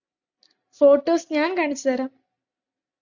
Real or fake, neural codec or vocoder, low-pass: real; none; 7.2 kHz